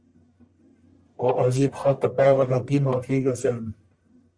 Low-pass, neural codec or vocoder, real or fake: 9.9 kHz; codec, 44.1 kHz, 1.7 kbps, Pupu-Codec; fake